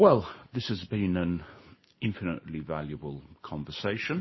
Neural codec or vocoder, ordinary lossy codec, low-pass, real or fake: none; MP3, 24 kbps; 7.2 kHz; real